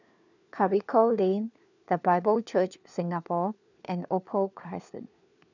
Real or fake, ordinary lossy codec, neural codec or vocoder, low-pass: fake; none; codec, 16 kHz, 4 kbps, FunCodec, trained on LibriTTS, 50 frames a second; 7.2 kHz